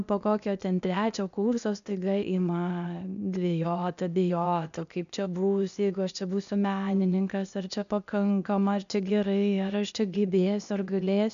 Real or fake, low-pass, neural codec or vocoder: fake; 7.2 kHz; codec, 16 kHz, 0.8 kbps, ZipCodec